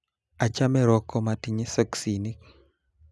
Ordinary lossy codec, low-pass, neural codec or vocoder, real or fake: none; none; none; real